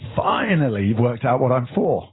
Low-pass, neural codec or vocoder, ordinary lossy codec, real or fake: 7.2 kHz; none; AAC, 16 kbps; real